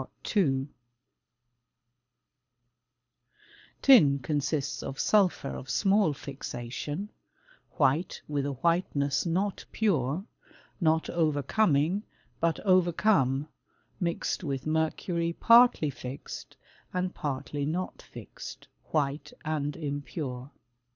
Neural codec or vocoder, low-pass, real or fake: codec, 24 kHz, 6 kbps, HILCodec; 7.2 kHz; fake